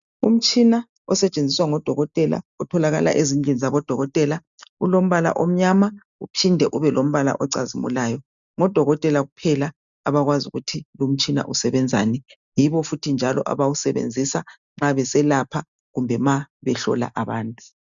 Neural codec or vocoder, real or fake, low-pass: none; real; 7.2 kHz